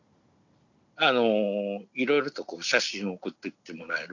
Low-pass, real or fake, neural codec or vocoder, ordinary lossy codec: 7.2 kHz; real; none; none